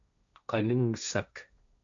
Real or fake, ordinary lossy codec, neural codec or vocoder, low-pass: fake; MP3, 64 kbps; codec, 16 kHz, 1.1 kbps, Voila-Tokenizer; 7.2 kHz